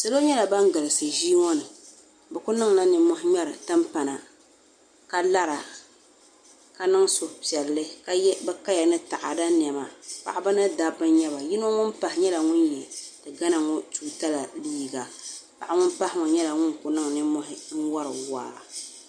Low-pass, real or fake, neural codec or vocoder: 9.9 kHz; real; none